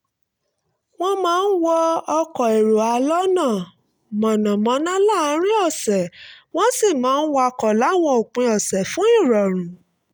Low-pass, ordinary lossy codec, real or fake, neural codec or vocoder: none; none; real; none